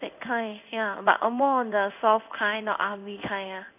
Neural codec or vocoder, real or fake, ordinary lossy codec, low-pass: codec, 16 kHz in and 24 kHz out, 1 kbps, XY-Tokenizer; fake; none; 3.6 kHz